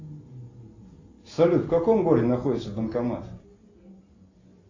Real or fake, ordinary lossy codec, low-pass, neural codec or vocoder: real; AAC, 32 kbps; 7.2 kHz; none